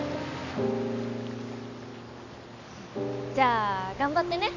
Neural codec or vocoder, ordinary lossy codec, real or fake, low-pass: none; none; real; 7.2 kHz